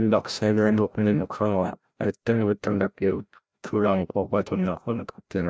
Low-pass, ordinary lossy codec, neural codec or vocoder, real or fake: none; none; codec, 16 kHz, 0.5 kbps, FreqCodec, larger model; fake